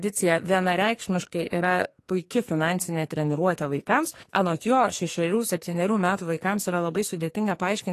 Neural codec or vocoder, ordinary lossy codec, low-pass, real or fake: codec, 44.1 kHz, 2.6 kbps, SNAC; AAC, 48 kbps; 14.4 kHz; fake